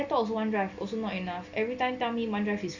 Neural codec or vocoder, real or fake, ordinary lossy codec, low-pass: none; real; none; 7.2 kHz